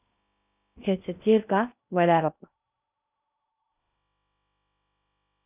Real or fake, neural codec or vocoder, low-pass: fake; codec, 16 kHz in and 24 kHz out, 0.6 kbps, FocalCodec, streaming, 2048 codes; 3.6 kHz